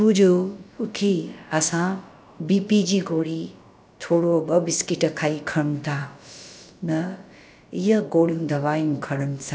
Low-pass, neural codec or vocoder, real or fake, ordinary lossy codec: none; codec, 16 kHz, about 1 kbps, DyCAST, with the encoder's durations; fake; none